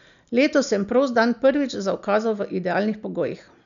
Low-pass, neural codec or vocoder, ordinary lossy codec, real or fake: 7.2 kHz; none; none; real